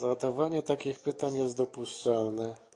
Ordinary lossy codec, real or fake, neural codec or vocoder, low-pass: AAC, 48 kbps; fake; codec, 44.1 kHz, 7.8 kbps, DAC; 10.8 kHz